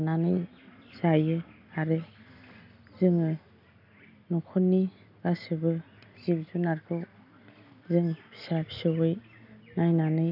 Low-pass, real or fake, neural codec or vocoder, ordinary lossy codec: 5.4 kHz; real; none; none